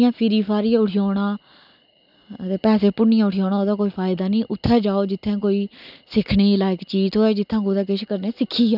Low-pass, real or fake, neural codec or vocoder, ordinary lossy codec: 5.4 kHz; real; none; none